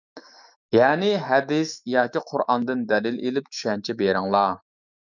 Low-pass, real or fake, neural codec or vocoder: 7.2 kHz; fake; autoencoder, 48 kHz, 128 numbers a frame, DAC-VAE, trained on Japanese speech